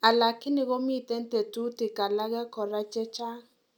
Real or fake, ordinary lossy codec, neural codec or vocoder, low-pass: real; none; none; 19.8 kHz